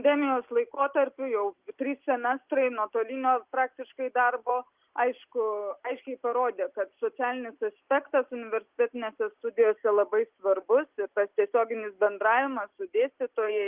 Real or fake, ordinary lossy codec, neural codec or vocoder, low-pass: real; Opus, 32 kbps; none; 3.6 kHz